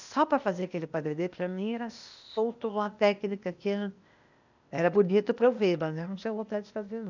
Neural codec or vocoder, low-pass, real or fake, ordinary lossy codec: codec, 16 kHz, 0.8 kbps, ZipCodec; 7.2 kHz; fake; none